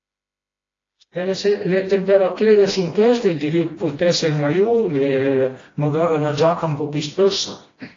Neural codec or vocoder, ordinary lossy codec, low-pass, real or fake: codec, 16 kHz, 1 kbps, FreqCodec, smaller model; AAC, 32 kbps; 7.2 kHz; fake